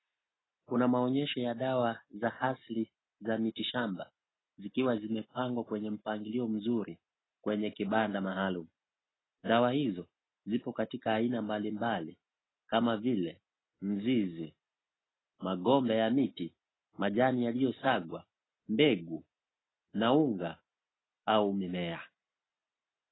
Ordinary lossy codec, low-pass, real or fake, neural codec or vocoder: AAC, 16 kbps; 7.2 kHz; real; none